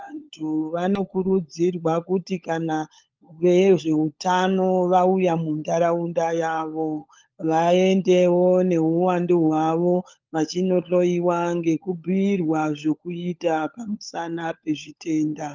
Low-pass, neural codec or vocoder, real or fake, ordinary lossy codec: 7.2 kHz; codec, 16 kHz, 16 kbps, FunCodec, trained on LibriTTS, 50 frames a second; fake; Opus, 24 kbps